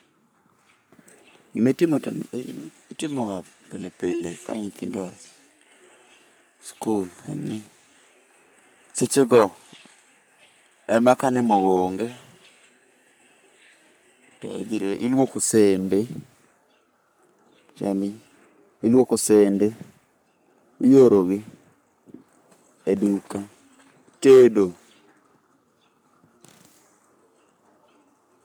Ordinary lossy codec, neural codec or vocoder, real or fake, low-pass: none; codec, 44.1 kHz, 3.4 kbps, Pupu-Codec; fake; none